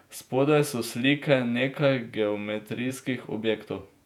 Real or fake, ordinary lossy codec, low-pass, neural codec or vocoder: fake; none; 19.8 kHz; vocoder, 48 kHz, 128 mel bands, Vocos